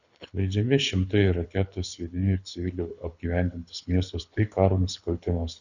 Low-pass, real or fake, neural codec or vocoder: 7.2 kHz; fake; codec, 24 kHz, 6 kbps, HILCodec